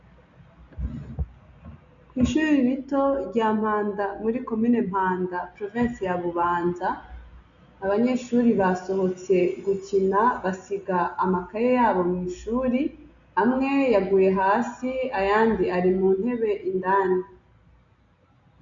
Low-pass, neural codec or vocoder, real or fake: 7.2 kHz; none; real